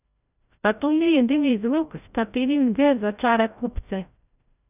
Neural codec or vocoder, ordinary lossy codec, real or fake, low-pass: codec, 16 kHz, 0.5 kbps, FreqCodec, larger model; none; fake; 3.6 kHz